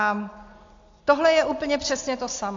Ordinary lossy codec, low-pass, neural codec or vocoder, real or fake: AAC, 48 kbps; 7.2 kHz; none; real